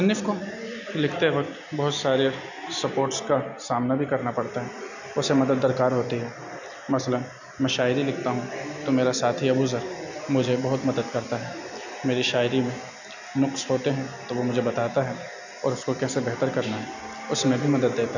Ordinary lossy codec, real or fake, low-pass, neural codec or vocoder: none; real; 7.2 kHz; none